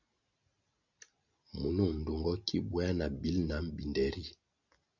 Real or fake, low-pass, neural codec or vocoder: real; 7.2 kHz; none